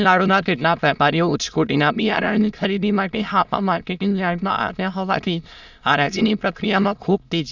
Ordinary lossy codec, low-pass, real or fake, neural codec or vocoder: none; 7.2 kHz; fake; autoencoder, 22.05 kHz, a latent of 192 numbers a frame, VITS, trained on many speakers